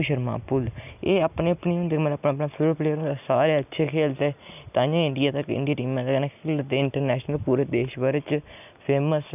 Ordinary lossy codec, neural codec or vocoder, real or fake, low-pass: none; none; real; 3.6 kHz